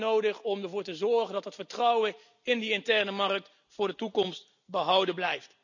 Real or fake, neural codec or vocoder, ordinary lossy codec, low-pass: real; none; none; 7.2 kHz